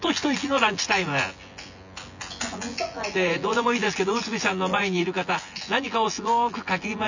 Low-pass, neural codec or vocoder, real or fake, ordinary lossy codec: 7.2 kHz; vocoder, 24 kHz, 100 mel bands, Vocos; fake; none